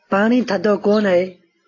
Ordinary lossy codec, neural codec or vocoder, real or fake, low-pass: AAC, 32 kbps; none; real; 7.2 kHz